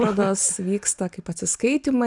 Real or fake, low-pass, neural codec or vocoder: real; 10.8 kHz; none